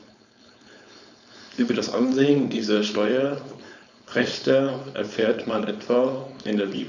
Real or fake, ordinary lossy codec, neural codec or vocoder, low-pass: fake; none; codec, 16 kHz, 4.8 kbps, FACodec; 7.2 kHz